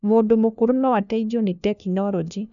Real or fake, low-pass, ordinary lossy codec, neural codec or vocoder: fake; 7.2 kHz; none; codec, 16 kHz, 1 kbps, FunCodec, trained on LibriTTS, 50 frames a second